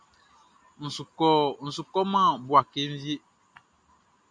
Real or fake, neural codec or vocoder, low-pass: real; none; 9.9 kHz